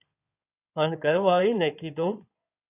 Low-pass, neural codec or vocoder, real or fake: 3.6 kHz; codec, 16 kHz in and 24 kHz out, 2.2 kbps, FireRedTTS-2 codec; fake